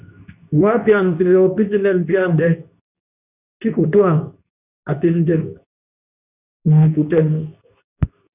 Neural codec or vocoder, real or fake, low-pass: codec, 24 kHz, 0.9 kbps, WavTokenizer, medium speech release version 2; fake; 3.6 kHz